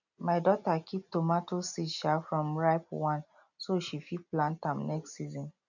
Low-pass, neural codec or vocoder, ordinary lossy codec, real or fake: 7.2 kHz; none; none; real